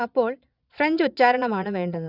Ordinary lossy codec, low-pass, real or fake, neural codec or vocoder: none; 5.4 kHz; fake; vocoder, 22.05 kHz, 80 mel bands, Vocos